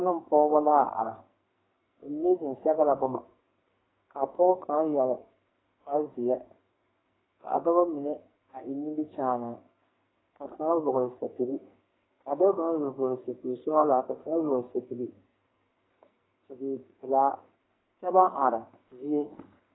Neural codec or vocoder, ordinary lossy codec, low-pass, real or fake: codec, 44.1 kHz, 2.6 kbps, SNAC; AAC, 16 kbps; 7.2 kHz; fake